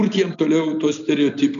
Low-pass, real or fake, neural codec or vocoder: 7.2 kHz; real; none